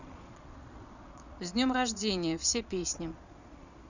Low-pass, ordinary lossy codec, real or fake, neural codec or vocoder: 7.2 kHz; none; fake; vocoder, 22.05 kHz, 80 mel bands, Vocos